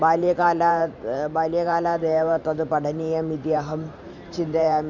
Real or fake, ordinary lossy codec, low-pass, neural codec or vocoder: fake; MP3, 64 kbps; 7.2 kHz; vocoder, 44.1 kHz, 128 mel bands every 512 samples, BigVGAN v2